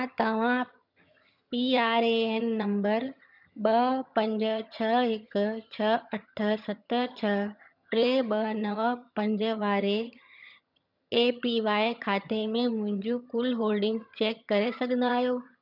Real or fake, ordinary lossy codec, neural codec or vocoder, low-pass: fake; none; vocoder, 22.05 kHz, 80 mel bands, HiFi-GAN; 5.4 kHz